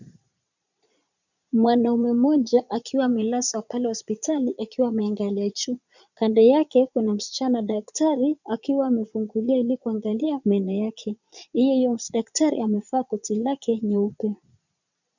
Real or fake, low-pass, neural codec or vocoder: fake; 7.2 kHz; vocoder, 22.05 kHz, 80 mel bands, Vocos